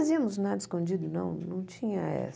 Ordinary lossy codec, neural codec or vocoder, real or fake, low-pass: none; none; real; none